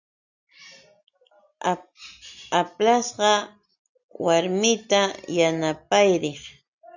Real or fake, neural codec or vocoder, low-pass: real; none; 7.2 kHz